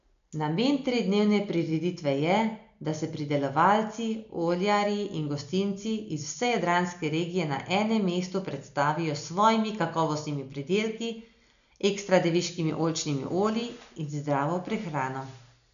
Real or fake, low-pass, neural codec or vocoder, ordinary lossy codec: real; 7.2 kHz; none; none